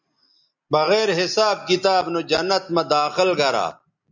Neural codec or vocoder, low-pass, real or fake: none; 7.2 kHz; real